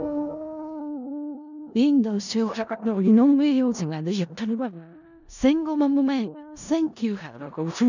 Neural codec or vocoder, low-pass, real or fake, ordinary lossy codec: codec, 16 kHz in and 24 kHz out, 0.4 kbps, LongCat-Audio-Codec, four codebook decoder; 7.2 kHz; fake; none